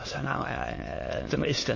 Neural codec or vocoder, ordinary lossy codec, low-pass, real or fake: autoencoder, 22.05 kHz, a latent of 192 numbers a frame, VITS, trained on many speakers; MP3, 32 kbps; 7.2 kHz; fake